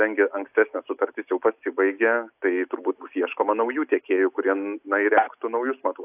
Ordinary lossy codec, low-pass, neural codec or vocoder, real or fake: AAC, 32 kbps; 3.6 kHz; none; real